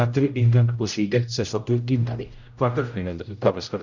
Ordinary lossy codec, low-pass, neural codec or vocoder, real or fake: none; 7.2 kHz; codec, 16 kHz, 0.5 kbps, X-Codec, HuBERT features, trained on general audio; fake